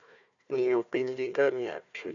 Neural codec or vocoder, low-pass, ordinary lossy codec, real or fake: codec, 16 kHz, 1 kbps, FunCodec, trained on Chinese and English, 50 frames a second; 7.2 kHz; none; fake